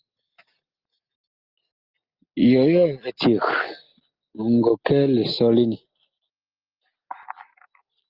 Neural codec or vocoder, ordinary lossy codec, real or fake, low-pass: none; Opus, 32 kbps; real; 5.4 kHz